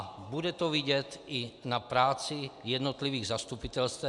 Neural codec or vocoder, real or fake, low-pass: none; real; 10.8 kHz